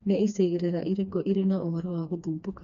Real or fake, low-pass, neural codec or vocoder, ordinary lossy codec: fake; 7.2 kHz; codec, 16 kHz, 2 kbps, FreqCodec, smaller model; none